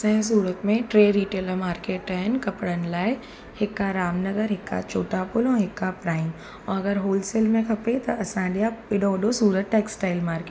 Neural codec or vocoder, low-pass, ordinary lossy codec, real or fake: none; none; none; real